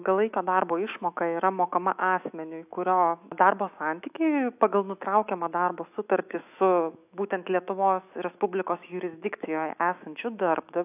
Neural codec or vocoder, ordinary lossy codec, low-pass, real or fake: autoencoder, 48 kHz, 128 numbers a frame, DAC-VAE, trained on Japanese speech; AAC, 32 kbps; 3.6 kHz; fake